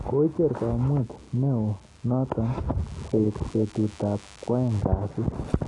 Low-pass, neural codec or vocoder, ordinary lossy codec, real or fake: 10.8 kHz; none; AAC, 32 kbps; real